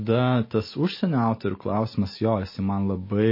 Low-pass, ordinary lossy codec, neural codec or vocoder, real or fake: 5.4 kHz; MP3, 24 kbps; none; real